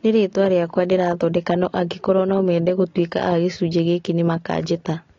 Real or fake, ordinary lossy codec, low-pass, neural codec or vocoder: real; AAC, 32 kbps; 7.2 kHz; none